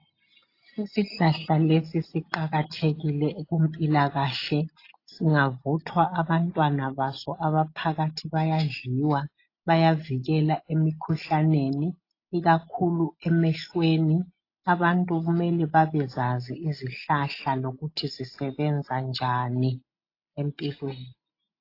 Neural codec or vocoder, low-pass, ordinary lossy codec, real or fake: none; 5.4 kHz; AAC, 32 kbps; real